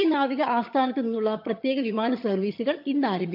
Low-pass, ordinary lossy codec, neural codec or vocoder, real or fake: 5.4 kHz; MP3, 48 kbps; vocoder, 22.05 kHz, 80 mel bands, HiFi-GAN; fake